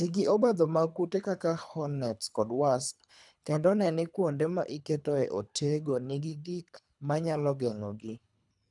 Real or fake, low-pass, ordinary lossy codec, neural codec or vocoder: fake; 10.8 kHz; none; codec, 24 kHz, 3 kbps, HILCodec